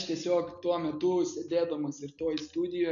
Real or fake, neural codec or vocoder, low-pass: real; none; 7.2 kHz